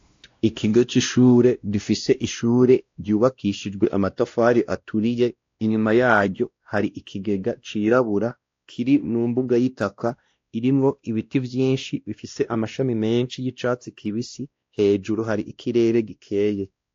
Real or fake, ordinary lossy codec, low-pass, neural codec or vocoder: fake; AAC, 48 kbps; 7.2 kHz; codec, 16 kHz, 1 kbps, X-Codec, WavLM features, trained on Multilingual LibriSpeech